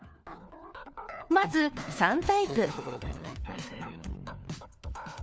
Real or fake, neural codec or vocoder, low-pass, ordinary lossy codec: fake; codec, 16 kHz, 4 kbps, FunCodec, trained on LibriTTS, 50 frames a second; none; none